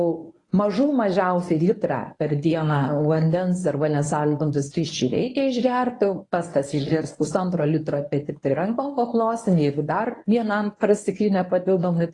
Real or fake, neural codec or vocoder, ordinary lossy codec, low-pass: fake; codec, 24 kHz, 0.9 kbps, WavTokenizer, medium speech release version 1; AAC, 32 kbps; 10.8 kHz